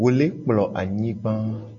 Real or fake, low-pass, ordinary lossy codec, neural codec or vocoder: real; 7.2 kHz; AAC, 48 kbps; none